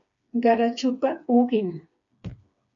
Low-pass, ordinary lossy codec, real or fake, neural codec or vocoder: 7.2 kHz; MP3, 64 kbps; fake; codec, 16 kHz, 4 kbps, FreqCodec, smaller model